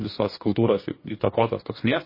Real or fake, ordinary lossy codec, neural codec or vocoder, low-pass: fake; MP3, 24 kbps; codec, 44.1 kHz, 2.6 kbps, DAC; 5.4 kHz